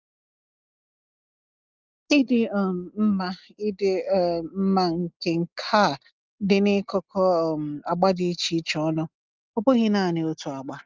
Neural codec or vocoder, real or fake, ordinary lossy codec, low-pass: none; real; Opus, 32 kbps; 7.2 kHz